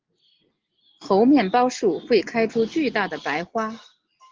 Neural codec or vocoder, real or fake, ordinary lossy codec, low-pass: none; real; Opus, 16 kbps; 7.2 kHz